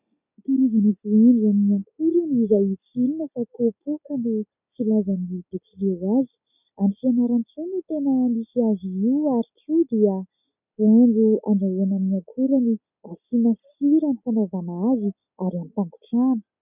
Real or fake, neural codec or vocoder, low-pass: real; none; 3.6 kHz